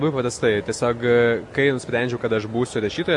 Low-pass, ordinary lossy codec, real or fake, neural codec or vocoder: 10.8 kHz; MP3, 48 kbps; real; none